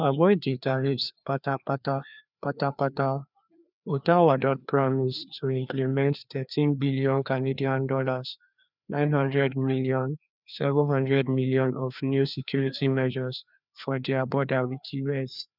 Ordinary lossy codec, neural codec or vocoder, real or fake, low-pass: none; codec, 16 kHz, 2 kbps, FreqCodec, larger model; fake; 5.4 kHz